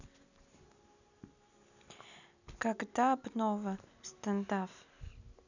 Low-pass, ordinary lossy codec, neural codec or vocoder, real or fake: 7.2 kHz; none; none; real